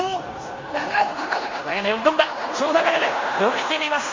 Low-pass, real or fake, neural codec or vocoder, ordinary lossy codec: 7.2 kHz; fake; codec, 16 kHz in and 24 kHz out, 0.9 kbps, LongCat-Audio-Codec, fine tuned four codebook decoder; MP3, 48 kbps